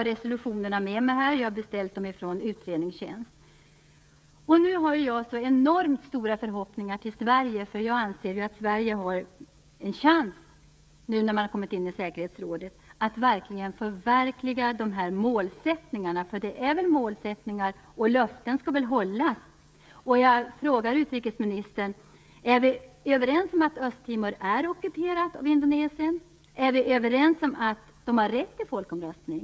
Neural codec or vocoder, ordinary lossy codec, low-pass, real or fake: codec, 16 kHz, 16 kbps, FreqCodec, smaller model; none; none; fake